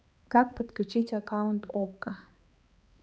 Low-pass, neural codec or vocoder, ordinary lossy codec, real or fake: none; codec, 16 kHz, 2 kbps, X-Codec, HuBERT features, trained on balanced general audio; none; fake